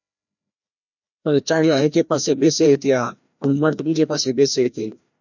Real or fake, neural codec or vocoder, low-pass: fake; codec, 16 kHz, 1 kbps, FreqCodec, larger model; 7.2 kHz